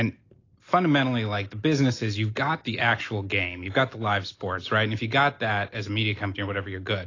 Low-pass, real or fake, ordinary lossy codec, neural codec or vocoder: 7.2 kHz; real; AAC, 32 kbps; none